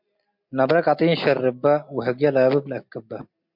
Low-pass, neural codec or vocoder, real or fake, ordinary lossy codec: 5.4 kHz; none; real; MP3, 48 kbps